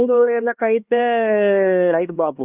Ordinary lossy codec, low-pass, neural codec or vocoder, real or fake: Opus, 32 kbps; 3.6 kHz; codec, 16 kHz, 2 kbps, X-Codec, HuBERT features, trained on LibriSpeech; fake